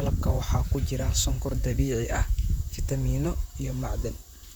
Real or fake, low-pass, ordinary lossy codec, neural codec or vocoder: fake; none; none; vocoder, 44.1 kHz, 128 mel bands, Pupu-Vocoder